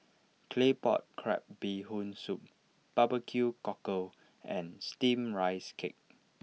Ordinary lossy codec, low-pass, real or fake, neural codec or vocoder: none; none; real; none